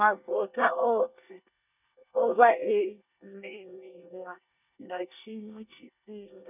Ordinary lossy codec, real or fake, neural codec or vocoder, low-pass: none; fake; codec, 24 kHz, 1 kbps, SNAC; 3.6 kHz